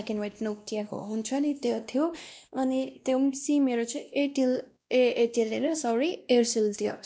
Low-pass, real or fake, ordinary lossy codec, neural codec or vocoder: none; fake; none; codec, 16 kHz, 1 kbps, X-Codec, WavLM features, trained on Multilingual LibriSpeech